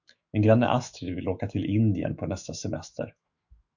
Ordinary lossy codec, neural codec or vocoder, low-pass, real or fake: Opus, 64 kbps; autoencoder, 48 kHz, 128 numbers a frame, DAC-VAE, trained on Japanese speech; 7.2 kHz; fake